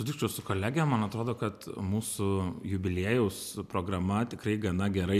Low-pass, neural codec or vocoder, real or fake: 14.4 kHz; none; real